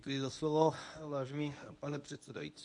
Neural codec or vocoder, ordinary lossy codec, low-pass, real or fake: codec, 24 kHz, 0.9 kbps, WavTokenizer, medium speech release version 1; AAC, 64 kbps; 10.8 kHz; fake